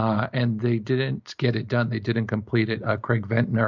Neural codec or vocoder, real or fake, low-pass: none; real; 7.2 kHz